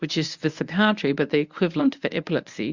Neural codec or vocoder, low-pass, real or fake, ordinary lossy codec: codec, 24 kHz, 0.9 kbps, WavTokenizer, medium speech release version 1; 7.2 kHz; fake; Opus, 64 kbps